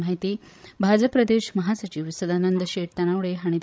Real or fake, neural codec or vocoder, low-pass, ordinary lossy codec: fake; codec, 16 kHz, 16 kbps, FreqCodec, larger model; none; none